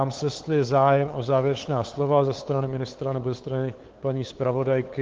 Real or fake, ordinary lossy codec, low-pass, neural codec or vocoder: fake; Opus, 16 kbps; 7.2 kHz; codec, 16 kHz, 8 kbps, FunCodec, trained on LibriTTS, 25 frames a second